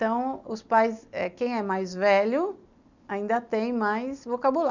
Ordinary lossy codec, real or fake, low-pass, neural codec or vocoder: none; real; 7.2 kHz; none